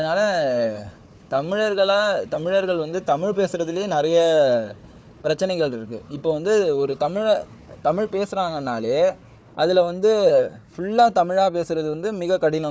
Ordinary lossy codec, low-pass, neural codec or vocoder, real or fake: none; none; codec, 16 kHz, 4 kbps, FunCodec, trained on Chinese and English, 50 frames a second; fake